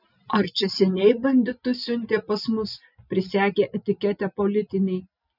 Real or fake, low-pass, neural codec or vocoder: real; 5.4 kHz; none